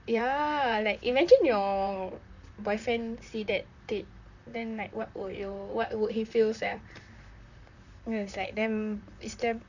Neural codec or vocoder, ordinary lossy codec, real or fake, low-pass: vocoder, 44.1 kHz, 128 mel bands, Pupu-Vocoder; none; fake; 7.2 kHz